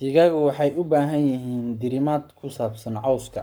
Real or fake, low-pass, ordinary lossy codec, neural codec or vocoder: fake; none; none; codec, 44.1 kHz, 7.8 kbps, Pupu-Codec